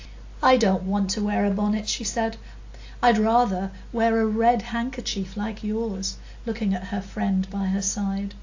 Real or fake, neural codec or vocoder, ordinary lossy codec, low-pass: real; none; AAC, 48 kbps; 7.2 kHz